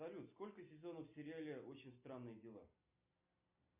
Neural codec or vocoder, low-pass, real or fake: none; 3.6 kHz; real